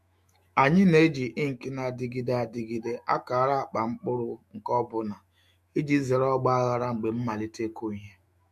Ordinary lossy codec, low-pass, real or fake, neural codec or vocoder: MP3, 64 kbps; 14.4 kHz; fake; autoencoder, 48 kHz, 128 numbers a frame, DAC-VAE, trained on Japanese speech